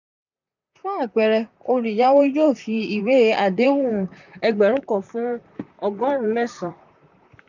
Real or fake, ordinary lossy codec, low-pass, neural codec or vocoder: fake; none; 7.2 kHz; vocoder, 44.1 kHz, 128 mel bands, Pupu-Vocoder